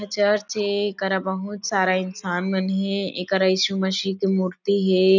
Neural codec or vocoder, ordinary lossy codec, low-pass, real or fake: none; none; 7.2 kHz; real